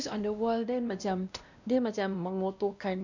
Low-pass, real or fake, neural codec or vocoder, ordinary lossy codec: 7.2 kHz; fake; codec, 16 kHz, 1 kbps, X-Codec, WavLM features, trained on Multilingual LibriSpeech; none